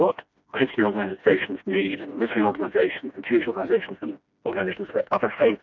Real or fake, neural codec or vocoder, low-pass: fake; codec, 16 kHz, 1 kbps, FreqCodec, smaller model; 7.2 kHz